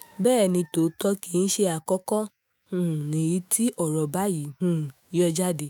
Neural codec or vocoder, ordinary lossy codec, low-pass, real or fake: autoencoder, 48 kHz, 128 numbers a frame, DAC-VAE, trained on Japanese speech; none; none; fake